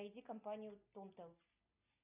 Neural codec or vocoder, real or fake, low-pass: none; real; 3.6 kHz